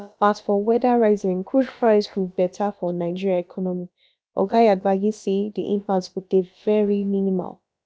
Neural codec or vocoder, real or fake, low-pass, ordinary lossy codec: codec, 16 kHz, about 1 kbps, DyCAST, with the encoder's durations; fake; none; none